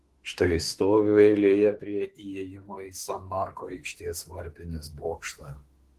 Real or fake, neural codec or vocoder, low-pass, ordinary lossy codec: fake; autoencoder, 48 kHz, 32 numbers a frame, DAC-VAE, trained on Japanese speech; 14.4 kHz; Opus, 16 kbps